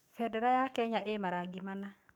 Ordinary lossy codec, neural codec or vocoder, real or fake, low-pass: none; codec, 44.1 kHz, 7.8 kbps, DAC; fake; 19.8 kHz